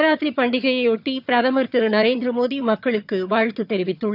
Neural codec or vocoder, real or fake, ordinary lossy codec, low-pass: vocoder, 22.05 kHz, 80 mel bands, HiFi-GAN; fake; none; 5.4 kHz